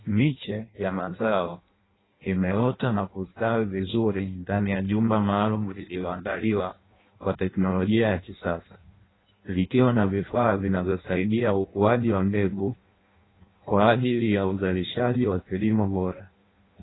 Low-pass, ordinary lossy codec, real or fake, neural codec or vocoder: 7.2 kHz; AAC, 16 kbps; fake; codec, 16 kHz in and 24 kHz out, 0.6 kbps, FireRedTTS-2 codec